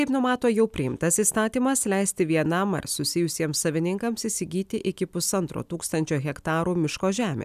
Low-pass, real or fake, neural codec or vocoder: 14.4 kHz; real; none